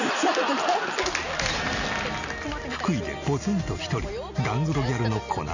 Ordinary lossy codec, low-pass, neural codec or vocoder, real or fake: none; 7.2 kHz; none; real